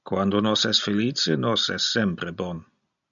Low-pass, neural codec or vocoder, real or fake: 7.2 kHz; none; real